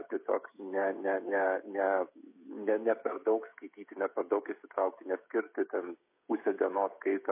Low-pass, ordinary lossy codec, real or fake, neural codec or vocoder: 3.6 kHz; MP3, 24 kbps; fake; codec, 16 kHz, 16 kbps, FreqCodec, smaller model